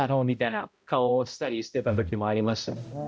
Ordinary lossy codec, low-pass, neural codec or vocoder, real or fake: none; none; codec, 16 kHz, 0.5 kbps, X-Codec, HuBERT features, trained on balanced general audio; fake